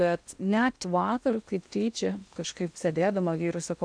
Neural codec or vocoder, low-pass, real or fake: codec, 16 kHz in and 24 kHz out, 0.8 kbps, FocalCodec, streaming, 65536 codes; 9.9 kHz; fake